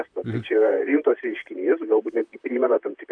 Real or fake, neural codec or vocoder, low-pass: fake; vocoder, 44.1 kHz, 128 mel bands, Pupu-Vocoder; 9.9 kHz